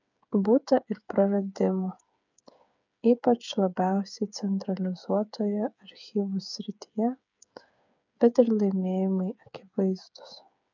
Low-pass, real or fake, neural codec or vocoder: 7.2 kHz; fake; codec, 16 kHz, 8 kbps, FreqCodec, smaller model